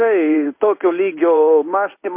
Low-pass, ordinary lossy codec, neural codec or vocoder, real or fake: 3.6 kHz; MP3, 24 kbps; codec, 16 kHz in and 24 kHz out, 1 kbps, XY-Tokenizer; fake